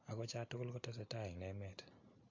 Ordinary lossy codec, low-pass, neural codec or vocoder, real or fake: AAC, 48 kbps; 7.2 kHz; none; real